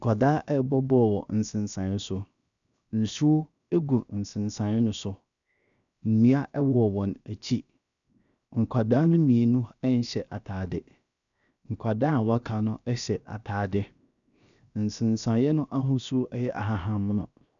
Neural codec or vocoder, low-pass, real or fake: codec, 16 kHz, 0.7 kbps, FocalCodec; 7.2 kHz; fake